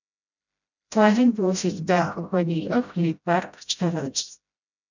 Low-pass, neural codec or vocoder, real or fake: 7.2 kHz; codec, 16 kHz, 0.5 kbps, FreqCodec, smaller model; fake